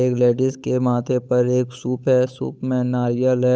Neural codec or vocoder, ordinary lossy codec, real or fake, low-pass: codec, 16 kHz, 8 kbps, FunCodec, trained on Chinese and English, 25 frames a second; none; fake; none